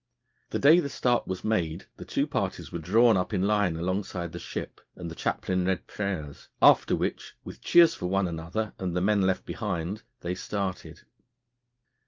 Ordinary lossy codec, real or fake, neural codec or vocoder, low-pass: Opus, 32 kbps; real; none; 7.2 kHz